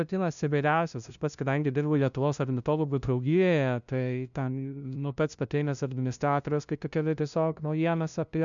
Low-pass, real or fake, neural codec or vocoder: 7.2 kHz; fake; codec, 16 kHz, 0.5 kbps, FunCodec, trained on LibriTTS, 25 frames a second